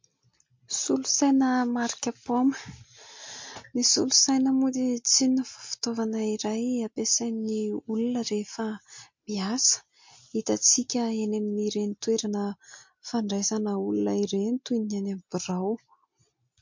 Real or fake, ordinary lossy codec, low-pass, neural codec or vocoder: real; MP3, 48 kbps; 7.2 kHz; none